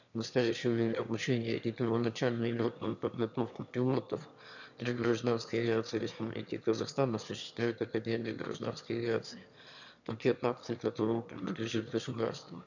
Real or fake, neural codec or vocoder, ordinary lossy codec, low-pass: fake; autoencoder, 22.05 kHz, a latent of 192 numbers a frame, VITS, trained on one speaker; none; 7.2 kHz